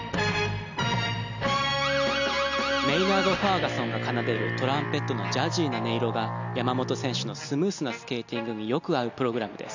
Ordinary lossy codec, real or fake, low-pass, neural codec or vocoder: none; real; 7.2 kHz; none